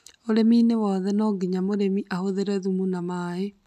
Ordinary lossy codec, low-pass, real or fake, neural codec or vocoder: none; 14.4 kHz; real; none